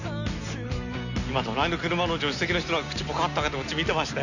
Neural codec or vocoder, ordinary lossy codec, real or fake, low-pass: none; none; real; 7.2 kHz